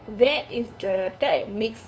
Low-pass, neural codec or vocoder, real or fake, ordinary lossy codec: none; codec, 16 kHz, 2 kbps, FunCodec, trained on LibriTTS, 25 frames a second; fake; none